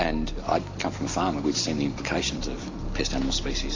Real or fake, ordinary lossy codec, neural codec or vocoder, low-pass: real; AAC, 32 kbps; none; 7.2 kHz